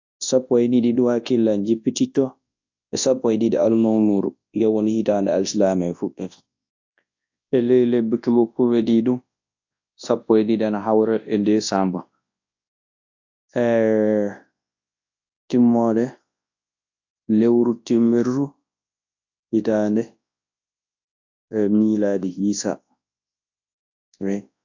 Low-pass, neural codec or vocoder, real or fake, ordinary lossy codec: 7.2 kHz; codec, 24 kHz, 0.9 kbps, WavTokenizer, large speech release; fake; AAC, 48 kbps